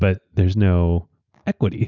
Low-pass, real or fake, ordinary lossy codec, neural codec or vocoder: 7.2 kHz; real; Opus, 64 kbps; none